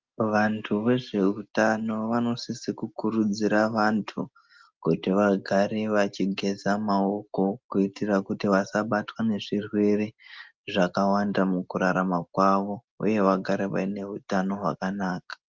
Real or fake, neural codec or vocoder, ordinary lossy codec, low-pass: real; none; Opus, 32 kbps; 7.2 kHz